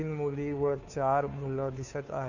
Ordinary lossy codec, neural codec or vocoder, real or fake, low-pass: none; codec, 16 kHz, 2 kbps, FunCodec, trained on Chinese and English, 25 frames a second; fake; 7.2 kHz